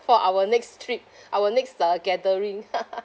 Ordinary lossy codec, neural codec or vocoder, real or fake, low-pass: none; none; real; none